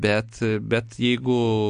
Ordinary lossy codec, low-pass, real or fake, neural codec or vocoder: MP3, 48 kbps; 9.9 kHz; real; none